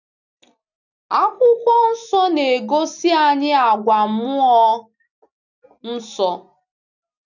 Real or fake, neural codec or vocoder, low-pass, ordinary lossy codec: real; none; 7.2 kHz; none